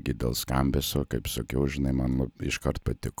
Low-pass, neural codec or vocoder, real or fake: 19.8 kHz; none; real